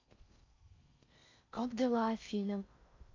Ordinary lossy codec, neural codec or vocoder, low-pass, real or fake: AAC, 48 kbps; codec, 16 kHz in and 24 kHz out, 0.6 kbps, FocalCodec, streaming, 4096 codes; 7.2 kHz; fake